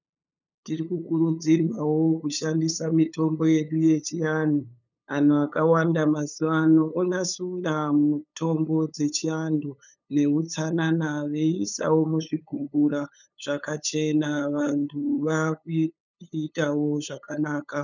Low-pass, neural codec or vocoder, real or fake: 7.2 kHz; codec, 16 kHz, 8 kbps, FunCodec, trained on LibriTTS, 25 frames a second; fake